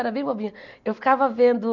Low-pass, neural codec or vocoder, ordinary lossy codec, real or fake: 7.2 kHz; none; none; real